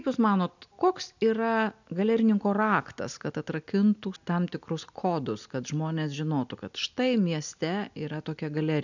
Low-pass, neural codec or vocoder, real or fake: 7.2 kHz; none; real